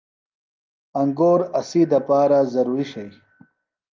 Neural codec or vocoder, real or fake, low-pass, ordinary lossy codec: none; real; 7.2 kHz; Opus, 24 kbps